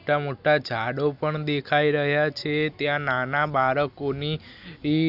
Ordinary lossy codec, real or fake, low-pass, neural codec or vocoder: none; real; 5.4 kHz; none